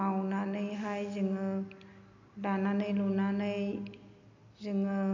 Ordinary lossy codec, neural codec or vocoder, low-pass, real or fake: MP3, 64 kbps; none; 7.2 kHz; real